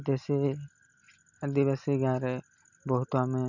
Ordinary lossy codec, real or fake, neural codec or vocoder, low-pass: none; real; none; 7.2 kHz